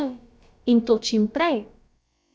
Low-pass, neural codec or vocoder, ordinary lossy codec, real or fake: none; codec, 16 kHz, about 1 kbps, DyCAST, with the encoder's durations; none; fake